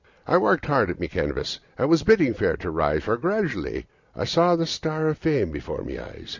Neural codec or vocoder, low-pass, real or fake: none; 7.2 kHz; real